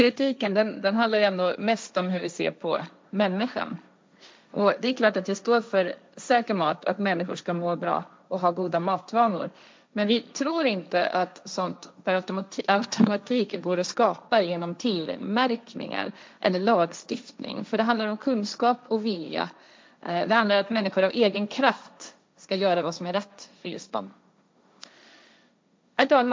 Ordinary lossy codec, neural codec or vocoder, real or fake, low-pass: none; codec, 16 kHz, 1.1 kbps, Voila-Tokenizer; fake; none